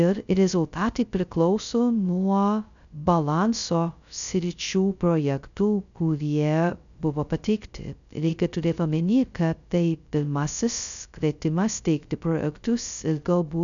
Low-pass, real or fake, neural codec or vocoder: 7.2 kHz; fake; codec, 16 kHz, 0.2 kbps, FocalCodec